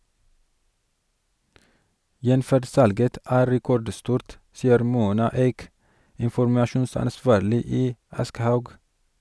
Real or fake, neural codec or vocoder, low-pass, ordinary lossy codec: real; none; none; none